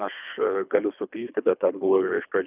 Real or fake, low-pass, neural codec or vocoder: fake; 3.6 kHz; codec, 24 kHz, 3 kbps, HILCodec